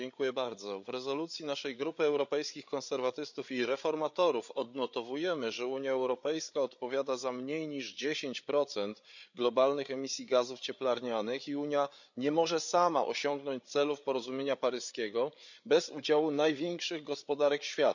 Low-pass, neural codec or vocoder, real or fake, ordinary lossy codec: 7.2 kHz; codec, 16 kHz, 8 kbps, FreqCodec, larger model; fake; none